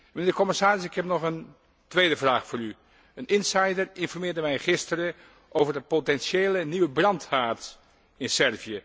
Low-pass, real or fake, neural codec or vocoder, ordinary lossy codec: none; real; none; none